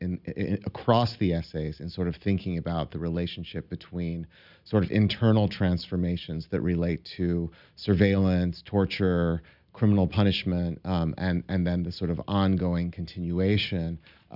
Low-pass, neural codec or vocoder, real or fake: 5.4 kHz; none; real